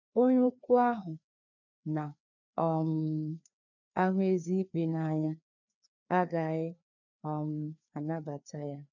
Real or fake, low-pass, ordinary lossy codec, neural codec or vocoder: fake; 7.2 kHz; none; codec, 16 kHz, 2 kbps, FreqCodec, larger model